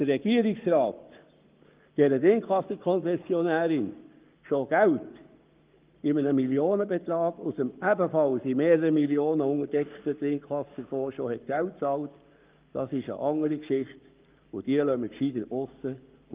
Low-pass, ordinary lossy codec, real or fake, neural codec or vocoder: 3.6 kHz; Opus, 32 kbps; fake; codec, 44.1 kHz, 7.8 kbps, Pupu-Codec